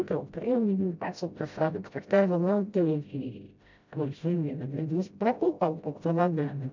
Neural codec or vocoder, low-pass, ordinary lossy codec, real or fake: codec, 16 kHz, 0.5 kbps, FreqCodec, smaller model; 7.2 kHz; MP3, 64 kbps; fake